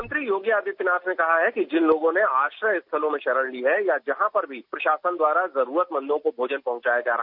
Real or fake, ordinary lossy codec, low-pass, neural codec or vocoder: real; none; 5.4 kHz; none